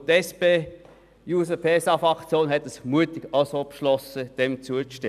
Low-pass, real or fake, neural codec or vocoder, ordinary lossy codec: 14.4 kHz; real; none; none